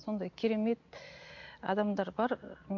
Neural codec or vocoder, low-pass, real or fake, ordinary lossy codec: none; 7.2 kHz; real; MP3, 48 kbps